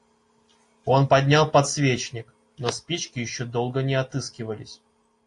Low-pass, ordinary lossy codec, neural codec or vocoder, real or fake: 10.8 kHz; AAC, 48 kbps; none; real